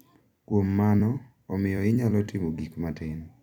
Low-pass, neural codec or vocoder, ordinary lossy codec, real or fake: 19.8 kHz; vocoder, 44.1 kHz, 128 mel bands every 256 samples, BigVGAN v2; none; fake